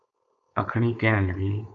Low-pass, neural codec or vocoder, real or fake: 7.2 kHz; codec, 16 kHz, 4.8 kbps, FACodec; fake